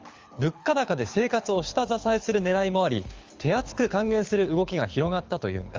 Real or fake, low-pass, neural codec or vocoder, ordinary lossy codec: fake; 7.2 kHz; codec, 24 kHz, 6 kbps, HILCodec; Opus, 32 kbps